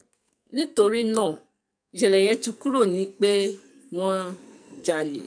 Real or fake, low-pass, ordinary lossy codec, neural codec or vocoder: fake; 9.9 kHz; none; codec, 44.1 kHz, 2.6 kbps, SNAC